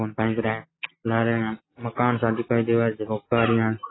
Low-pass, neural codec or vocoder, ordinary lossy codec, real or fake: 7.2 kHz; none; AAC, 16 kbps; real